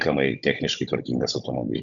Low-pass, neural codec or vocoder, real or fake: 7.2 kHz; codec, 16 kHz, 16 kbps, FunCodec, trained on Chinese and English, 50 frames a second; fake